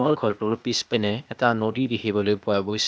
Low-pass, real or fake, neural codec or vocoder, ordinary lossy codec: none; fake; codec, 16 kHz, 0.8 kbps, ZipCodec; none